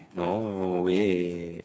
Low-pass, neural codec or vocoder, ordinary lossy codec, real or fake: none; codec, 16 kHz, 4 kbps, FreqCodec, smaller model; none; fake